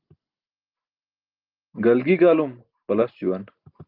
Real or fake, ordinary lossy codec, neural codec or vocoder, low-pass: real; Opus, 32 kbps; none; 5.4 kHz